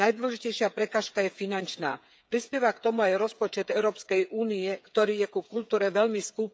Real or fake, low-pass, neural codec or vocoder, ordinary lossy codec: fake; none; codec, 16 kHz, 8 kbps, FreqCodec, smaller model; none